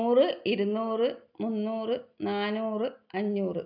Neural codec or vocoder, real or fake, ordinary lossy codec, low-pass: none; real; none; 5.4 kHz